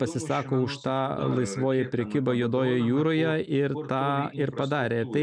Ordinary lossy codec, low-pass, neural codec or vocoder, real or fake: MP3, 96 kbps; 9.9 kHz; none; real